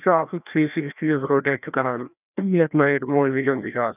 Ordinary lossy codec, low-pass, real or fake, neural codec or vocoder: none; 3.6 kHz; fake; codec, 16 kHz, 1 kbps, FreqCodec, larger model